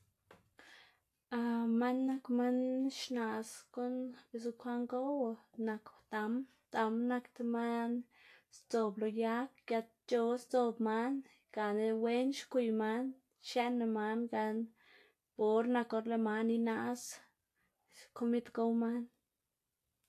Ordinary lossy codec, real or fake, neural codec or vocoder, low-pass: AAC, 64 kbps; real; none; 14.4 kHz